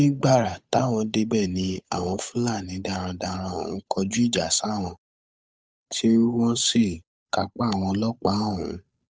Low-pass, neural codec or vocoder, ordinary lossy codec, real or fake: none; codec, 16 kHz, 8 kbps, FunCodec, trained on Chinese and English, 25 frames a second; none; fake